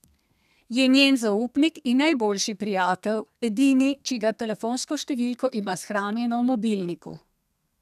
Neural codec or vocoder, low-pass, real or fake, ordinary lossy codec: codec, 32 kHz, 1.9 kbps, SNAC; 14.4 kHz; fake; none